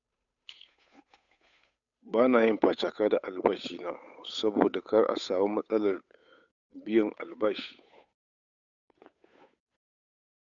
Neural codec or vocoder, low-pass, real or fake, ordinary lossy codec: codec, 16 kHz, 8 kbps, FunCodec, trained on Chinese and English, 25 frames a second; 7.2 kHz; fake; none